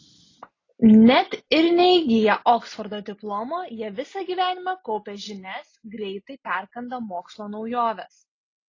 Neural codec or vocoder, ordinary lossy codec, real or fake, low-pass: none; AAC, 32 kbps; real; 7.2 kHz